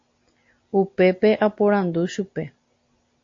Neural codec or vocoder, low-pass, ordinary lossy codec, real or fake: none; 7.2 kHz; AAC, 48 kbps; real